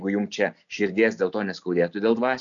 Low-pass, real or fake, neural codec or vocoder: 7.2 kHz; real; none